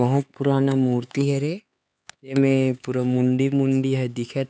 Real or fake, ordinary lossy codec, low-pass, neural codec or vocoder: fake; none; none; codec, 16 kHz, 4 kbps, X-Codec, HuBERT features, trained on LibriSpeech